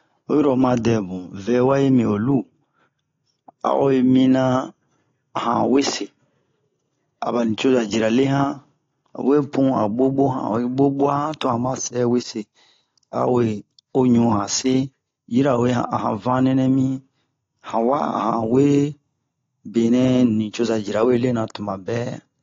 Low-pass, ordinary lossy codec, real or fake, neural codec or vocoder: 7.2 kHz; AAC, 32 kbps; real; none